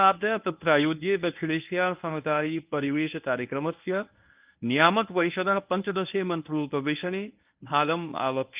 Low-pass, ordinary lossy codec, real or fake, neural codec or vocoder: 3.6 kHz; Opus, 24 kbps; fake; codec, 24 kHz, 0.9 kbps, WavTokenizer, medium speech release version 1